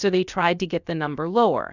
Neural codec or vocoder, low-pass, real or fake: codec, 16 kHz, about 1 kbps, DyCAST, with the encoder's durations; 7.2 kHz; fake